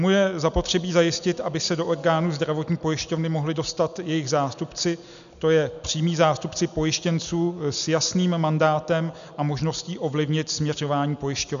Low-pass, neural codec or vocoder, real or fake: 7.2 kHz; none; real